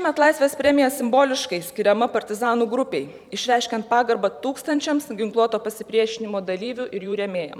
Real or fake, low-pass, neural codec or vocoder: fake; 19.8 kHz; vocoder, 44.1 kHz, 128 mel bands every 512 samples, BigVGAN v2